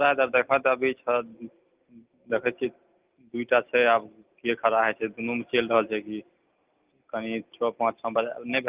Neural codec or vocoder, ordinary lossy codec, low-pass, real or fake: none; Opus, 24 kbps; 3.6 kHz; real